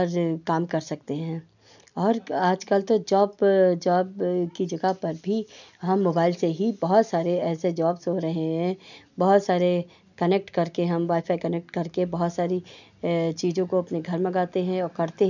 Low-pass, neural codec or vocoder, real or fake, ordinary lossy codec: 7.2 kHz; none; real; none